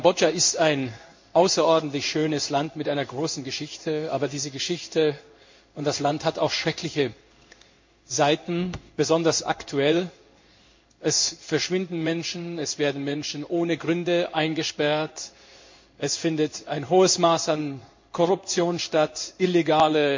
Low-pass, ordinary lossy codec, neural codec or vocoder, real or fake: 7.2 kHz; MP3, 64 kbps; codec, 16 kHz in and 24 kHz out, 1 kbps, XY-Tokenizer; fake